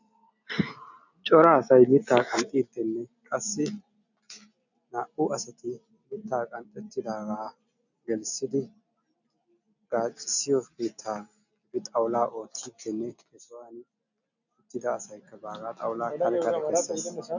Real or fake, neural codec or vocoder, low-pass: real; none; 7.2 kHz